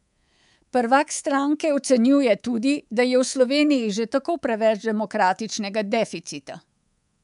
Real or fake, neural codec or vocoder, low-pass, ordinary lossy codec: fake; codec, 24 kHz, 3.1 kbps, DualCodec; 10.8 kHz; none